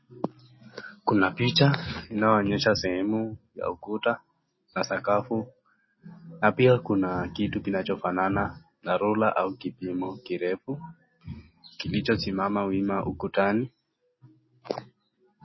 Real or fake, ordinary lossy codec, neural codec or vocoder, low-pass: real; MP3, 24 kbps; none; 7.2 kHz